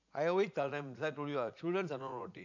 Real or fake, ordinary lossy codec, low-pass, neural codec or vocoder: fake; none; 7.2 kHz; vocoder, 44.1 kHz, 80 mel bands, Vocos